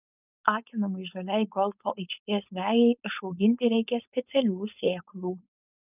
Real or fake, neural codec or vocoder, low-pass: fake; codec, 16 kHz, 4.8 kbps, FACodec; 3.6 kHz